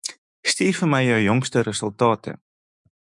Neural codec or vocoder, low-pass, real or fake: autoencoder, 48 kHz, 128 numbers a frame, DAC-VAE, trained on Japanese speech; 10.8 kHz; fake